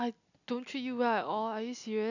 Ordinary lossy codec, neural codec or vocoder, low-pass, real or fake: none; none; 7.2 kHz; real